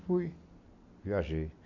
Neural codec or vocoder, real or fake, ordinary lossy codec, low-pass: none; real; none; 7.2 kHz